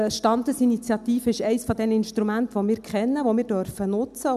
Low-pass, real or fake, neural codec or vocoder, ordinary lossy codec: 14.4 kHz; real; none; none